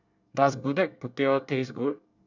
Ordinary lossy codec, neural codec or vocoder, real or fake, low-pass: none; codec, 24 kHz, 1 kbps, SNAC; fake; 7.2 kHz